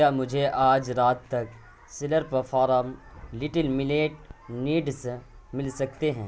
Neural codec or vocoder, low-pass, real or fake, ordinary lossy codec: none; none; real; none